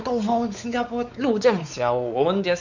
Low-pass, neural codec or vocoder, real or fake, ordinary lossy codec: 7.2 kHz; codec, 16 kHz, 4 kbps, X-Codec, WavLM features, trained on Multilingual LibriSpeech; fake; none